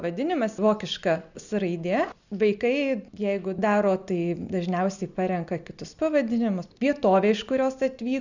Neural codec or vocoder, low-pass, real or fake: none; 7.2 kHz; real